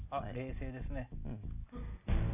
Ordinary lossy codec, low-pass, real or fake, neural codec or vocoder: none; 3.6 kHz; real; none